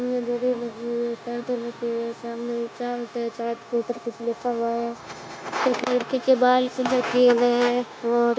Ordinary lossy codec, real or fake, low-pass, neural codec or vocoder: none; fake; none; codec, 16 kHz, 0.9 kbps, LongCat-Audio-Codec